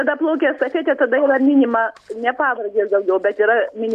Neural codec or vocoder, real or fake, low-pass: none; real; 14.4 kHz